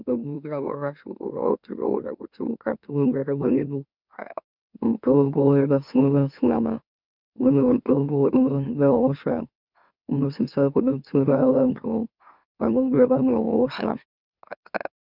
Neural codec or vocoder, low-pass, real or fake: autoencoder, 44.1 kHz, a latent of 192 numbers a frame, MeloTTS; 5.4 kHz; fake